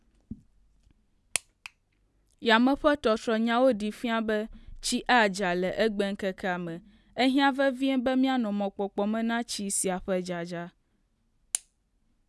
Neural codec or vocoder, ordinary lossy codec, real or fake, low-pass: none; none; real; none